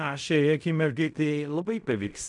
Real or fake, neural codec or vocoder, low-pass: fake; codec, 16 kHz in and 24 kHz out, 0.4 kbps, LongCat-Audio-Codec, fine tuned four codebook decoder; 10.8 kHz